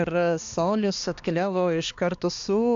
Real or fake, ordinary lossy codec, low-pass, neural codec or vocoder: fake; AAC, 64 kbps; 7.2 kHz; codec, 16 kHz, 2 kbps, X-Codec, HuBERT features, trained on balanced general audio